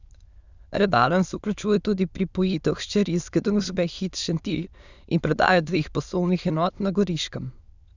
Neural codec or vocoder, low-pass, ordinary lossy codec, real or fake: autoencoder, 22.05 kHz, a latent of 192 numbers a frame, VITS, trained on many speakers; 7.2 kHz; Opus, 64 kbps; fake